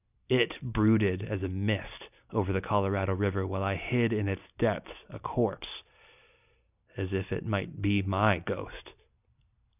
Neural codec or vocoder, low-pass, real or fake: none; 3.6 kHz; real